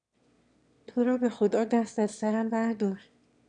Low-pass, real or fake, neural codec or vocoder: 9.9 kHz; fake; autoencoder, 22.05 kHz, a latent of 192 numbers a frame, VITS, trained on one speaker